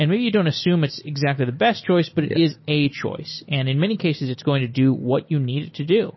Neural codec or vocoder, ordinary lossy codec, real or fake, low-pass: none; MP3, 24 kbps; real; 7.2 kHz